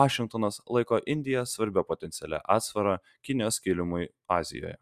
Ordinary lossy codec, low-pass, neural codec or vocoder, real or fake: Opus, 64 kbps; 14.4 kHz; none; real